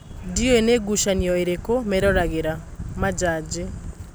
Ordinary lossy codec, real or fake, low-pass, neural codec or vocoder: none; real; none; none